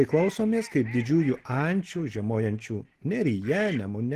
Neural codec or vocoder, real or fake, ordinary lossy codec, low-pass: none; real; Opus, 16 kbps; 14.4 kHz